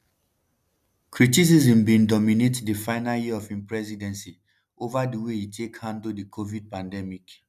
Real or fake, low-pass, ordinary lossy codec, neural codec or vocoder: real; 14.4 kHz; none; none